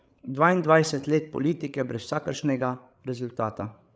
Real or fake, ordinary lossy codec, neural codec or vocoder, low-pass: fake; none; codec, 16 kHz, 8 kbps, FreqCodec, larger model; none